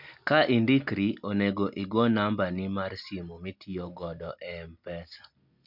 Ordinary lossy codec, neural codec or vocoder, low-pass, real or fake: MP3, 48 kbps; none; 5.4 kHz; real